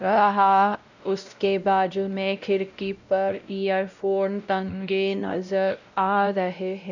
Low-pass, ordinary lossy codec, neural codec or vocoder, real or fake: 7.2 kHz; none; codec, 16 kHz, 0.5 kbps, X-Codec, WavLM features, trained on Multilingual LibriSpeech; fake